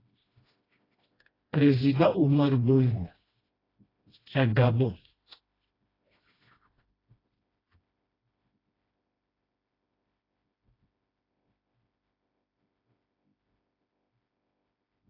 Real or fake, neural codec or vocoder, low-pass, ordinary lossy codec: fake; codec, 16 kHz, 1 kbps, FreqCodec, smaller model; 5.4 kHz; AAC, 24 kbps